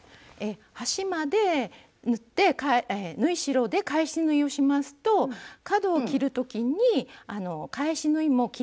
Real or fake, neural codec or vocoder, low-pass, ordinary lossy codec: real; none; none; none